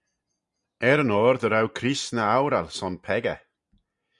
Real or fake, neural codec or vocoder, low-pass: real; none; 10.8 kHz